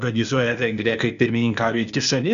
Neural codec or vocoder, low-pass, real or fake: codec, 16 kHz, 0.8 kbps, ZipCodec; 7.2 kHz; fake